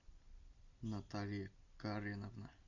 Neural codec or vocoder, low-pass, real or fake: none; 7.2 kHz; real